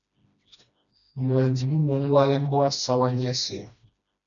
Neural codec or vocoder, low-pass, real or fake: codec, 16 kHz, 1 kbps, FreqCodec, smaller model; 7.2 kHz; fake